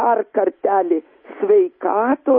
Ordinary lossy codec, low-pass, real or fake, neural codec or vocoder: MP3, 24 kbps; 5.4 kHz; real; none